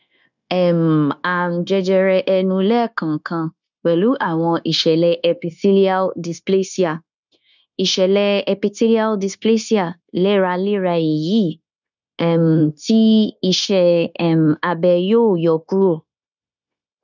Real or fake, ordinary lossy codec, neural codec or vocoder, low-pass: fake; none; codec, 16 kHz, 0.9 kbps, LongCat-Audio-Codec; 7.2 kHz